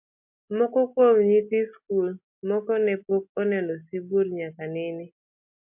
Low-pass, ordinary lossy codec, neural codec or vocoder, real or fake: 3.6 kHz; MP3, 32 kbps; none; real